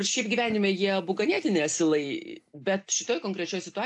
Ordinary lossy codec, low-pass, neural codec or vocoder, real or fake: AAC, 64 kbps; 10.8 kHz; none; real